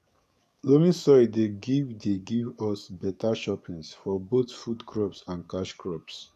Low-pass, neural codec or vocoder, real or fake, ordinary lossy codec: 14.4 kHz; codec, 44.1 kHz, 7.8 kbps, DAC; fake; none